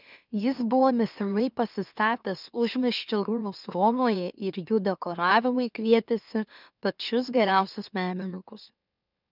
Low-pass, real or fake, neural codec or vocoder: 5.4 kHz; fake; autoencoder, 44.1 kHz, a latent of 192 numbers a frame, MeloTTS